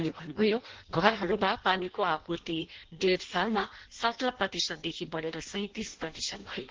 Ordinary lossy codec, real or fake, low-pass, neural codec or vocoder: Opus, 16 kbps; fake; 7.2 kHz; codec, 16 kHz in and 24 kHz out, 0.6 kbps, FireRedTTS-2 codec